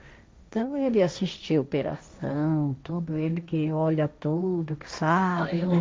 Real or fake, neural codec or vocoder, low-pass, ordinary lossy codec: fake; codec, 16 kHz, 1.1 kbps, Voila-Tokenizer; none; none